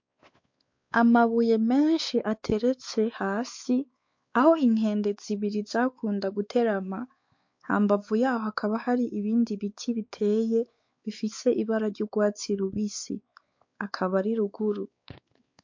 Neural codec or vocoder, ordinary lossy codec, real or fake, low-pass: codec, 16 kHz, 4 kbps, X-Codec, WavLM features, trained on Multilingual LibriSpeech; MP3, 48 kbps; fake; 7.2 kHz